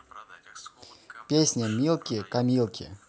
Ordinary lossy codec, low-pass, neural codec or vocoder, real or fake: none; none; none; real